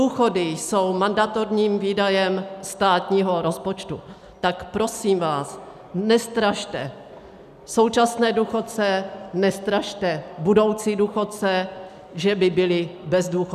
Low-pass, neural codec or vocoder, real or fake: 14.4 kHz; none; real